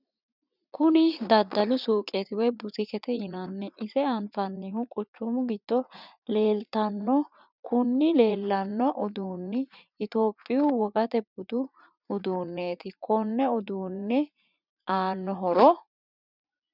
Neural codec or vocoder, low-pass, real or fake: vocoder, 44.1 kHz, 80 mel bands, Vocos; 5.4 kHz; fake